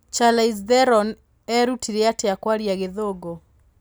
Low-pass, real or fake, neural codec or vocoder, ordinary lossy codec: none; real; none; none